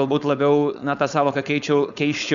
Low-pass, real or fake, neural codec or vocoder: 7.2 kHz; fake; codec, 16 kHz, 4.8 kbps, FACodec